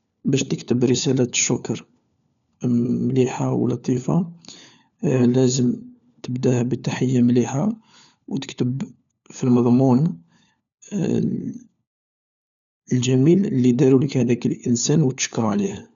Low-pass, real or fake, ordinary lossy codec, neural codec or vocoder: 7.2 kHz; fake; none; codec, 16 kHz, 4 kbps, FunCodec, trained on LibriTTS, 50 frames a second